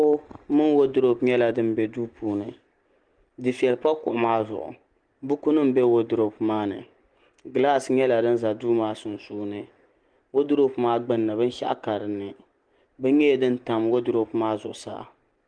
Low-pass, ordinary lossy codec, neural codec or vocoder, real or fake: 9.9 kHz; Opus, 24 kbps; none; real